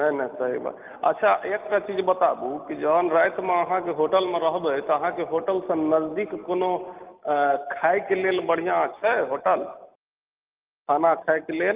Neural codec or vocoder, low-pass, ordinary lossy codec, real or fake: none; 3.6 kHz; Opus, 32 kbps; real